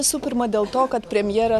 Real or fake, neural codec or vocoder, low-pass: real; none; 14.4 kHz